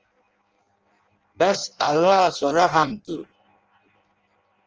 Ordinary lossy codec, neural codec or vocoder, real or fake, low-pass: Opus, 24 kbps; codec, 16 kHz in and 24 kHz out, 0.6 kbps, FireRedTTS-2 codec; fake; 7.2 kHz